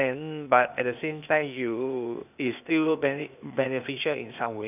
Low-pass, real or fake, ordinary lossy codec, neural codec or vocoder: 3.6 kHz; fake; none; codec, 16 kHz, 0.8 kbps, ZipCodec